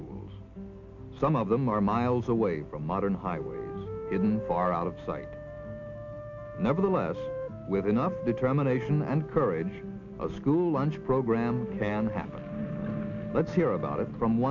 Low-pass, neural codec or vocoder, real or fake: 7.2 kHz; none; real